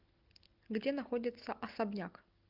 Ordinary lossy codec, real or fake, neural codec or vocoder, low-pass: Opus, 24 kbps; real; none; 5.4 kHz